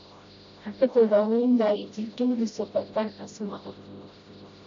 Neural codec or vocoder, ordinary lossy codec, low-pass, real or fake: codec, 16 kHz, 0.5 kbps, FreqCodec, smaller model; MP3, 48 kbps; 7.2 kHz; fake